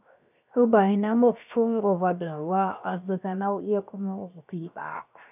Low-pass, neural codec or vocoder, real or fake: 3.6 kHz; codec, 16 kHz, 0.7 kbps, FocalCodec; fake